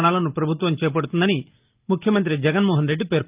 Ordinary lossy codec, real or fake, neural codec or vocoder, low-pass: Opus, 32 kbps; real; none; 3.6 kHz